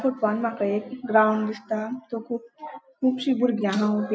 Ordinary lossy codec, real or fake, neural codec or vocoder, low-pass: none; real; none; none